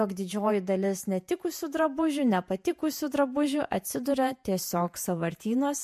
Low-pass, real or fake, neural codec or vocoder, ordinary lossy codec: 14.4 kHz; fake; vocoder, 48 kHz, 128 mel bands, Vocos; MP3, 64 kbps